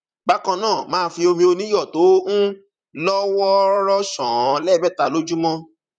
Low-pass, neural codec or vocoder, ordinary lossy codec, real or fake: 9.9 kHz; vocoder, 44.1 kHz, 128 mel bands, Pupu-Vocoder; none; fake